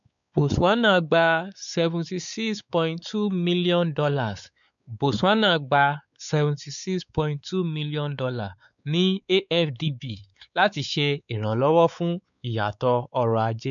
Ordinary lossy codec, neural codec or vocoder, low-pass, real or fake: MP3, 96 kbps; codec, 16 kHz, 4 kbps, X-Codec, WavLM features, trained on Multilingual LibriSpeech; 7.2 kHz; fake